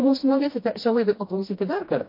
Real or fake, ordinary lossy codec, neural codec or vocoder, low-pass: fake; MP3, 32 kbps; codec, 16 kHz, 1 kbps, FreqCodec, smaller model; 5.4 kHz